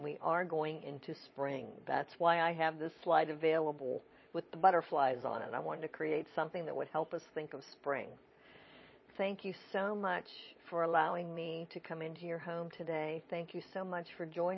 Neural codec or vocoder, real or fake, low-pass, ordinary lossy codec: vocoder, 22.05 kHz, 80 mel bands, Vocos; fake; 7.2 kHz; MP3, 24 kbps